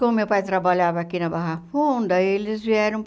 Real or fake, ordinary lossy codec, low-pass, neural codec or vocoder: real; none; none; none